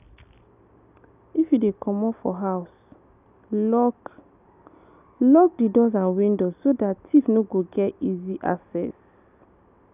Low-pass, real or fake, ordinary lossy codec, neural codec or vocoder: 3.6 kHz; real; none; none